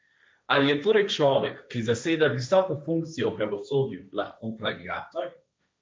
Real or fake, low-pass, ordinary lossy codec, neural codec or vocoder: fake; none; none; codec, 16 kHz, 1.1 kbps, Voila-Tokenizer